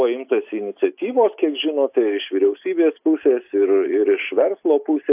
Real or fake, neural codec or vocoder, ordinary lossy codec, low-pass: real; none; AAC, 32 kbps; 3.6 kHz